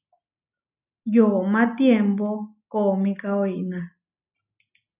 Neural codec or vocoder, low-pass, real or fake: none; 3.6 kHz; real